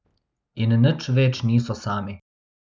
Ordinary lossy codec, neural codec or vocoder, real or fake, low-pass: none; none; real; none